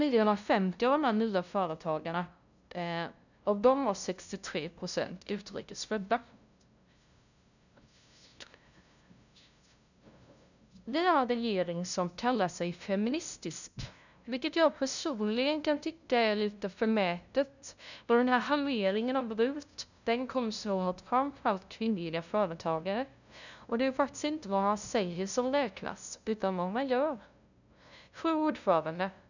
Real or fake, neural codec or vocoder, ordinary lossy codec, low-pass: fake; codec, 16 kHz, 0.5 kbps, FunCodec, trained on LibriTTS, 25 frames a second; none; 7.2 kHz